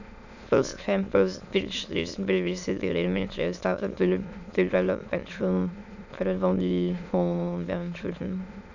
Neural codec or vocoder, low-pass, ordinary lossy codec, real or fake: autoencoder, 22.05 kHz, a latent of 192 numbers a frame, VITS, trained on many speakers; 7.2 kHz; none; fake